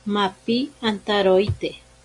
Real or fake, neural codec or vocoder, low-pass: real; none; 10.8 kHz